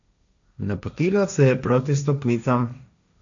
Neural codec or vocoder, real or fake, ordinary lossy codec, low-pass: codec, 16 kHz, 1.1 kbps, Voila-Tokenizer; fake; none; 7.2 kHz